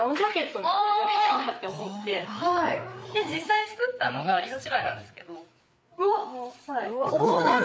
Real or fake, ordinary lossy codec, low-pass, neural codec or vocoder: fake; none; none; codec, 16 kHz, 4 kbps, FreqCodec, larger model